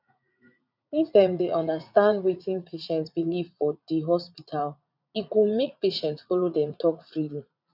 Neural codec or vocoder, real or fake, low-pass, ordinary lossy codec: vocoder, 44.1 kHz, 80 mel bands, Vocos; fake; 5.4 kHz; none